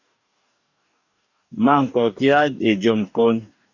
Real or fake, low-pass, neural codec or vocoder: fake; 7.2 kHz; codec, 44.1 kHz, 2.6 kbps, DAC